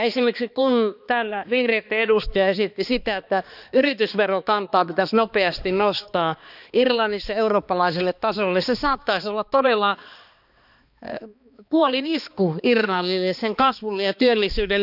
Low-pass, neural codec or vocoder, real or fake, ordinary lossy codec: 5.4 kHz; codec, 16 kHz, 2 kbps, X-Codec, HuBERT features, trained on balanced general audio; fake; none